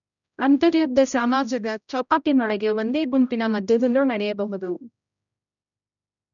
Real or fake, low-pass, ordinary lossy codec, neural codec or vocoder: fake; 7.2 kHz; none; codec, 16 kHz, 0.5 kbps, X-Codec, HuBERT features, trained on general audio